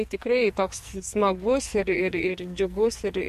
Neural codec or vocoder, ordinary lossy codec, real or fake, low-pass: codec, 44.1 kHz, 2.6 kbps, SNAC; MP3, 64 kbps; fake; 14.4 kHz